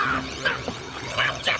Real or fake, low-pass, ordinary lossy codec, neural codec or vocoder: fake; none; none; codec, 16 kHz, 16 kbps, FunCodec, trained on LibriTTS, 50 frames a second